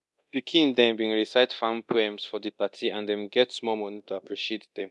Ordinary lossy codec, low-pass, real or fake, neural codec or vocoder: none; none; fake; codec, 24 kHz, 0.9 kbps, DualCodec